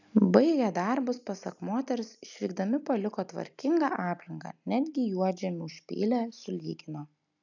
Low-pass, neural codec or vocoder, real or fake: 7.2 kHz; none; real